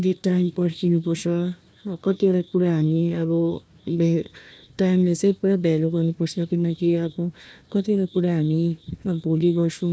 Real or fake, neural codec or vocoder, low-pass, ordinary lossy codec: fake; codec, 16 kHz, 1 kbps, FunCodec, trained on Chinese and English, 50 frames a second; none; none